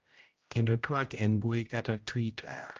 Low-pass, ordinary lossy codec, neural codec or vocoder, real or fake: 7.2 kHz; Opus, 24 kbps; codec, 16 kHz, 0.5 kbps, X-Codec, HuBERT features, trained on general audio; fake